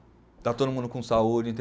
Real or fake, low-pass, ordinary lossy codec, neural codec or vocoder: real; none; none; none